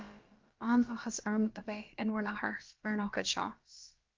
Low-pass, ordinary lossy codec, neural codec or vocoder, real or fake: 7.2 kHz; Opus, 32 kbps; codec, 16 kHz, about 1 kbps, DyCAST, with the encoder's durations; fake